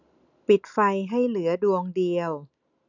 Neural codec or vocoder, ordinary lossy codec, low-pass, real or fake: none; none; 7.2 kHz; real